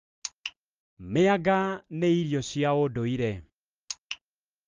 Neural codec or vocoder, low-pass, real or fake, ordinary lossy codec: none; 7.2 kHz; real; Opus, 32 kbps